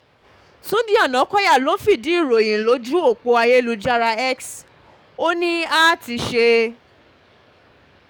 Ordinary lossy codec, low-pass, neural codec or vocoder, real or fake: none; 19.8 kHz; codec, 44.1 kHz, 7.8 kbps, DAC; fake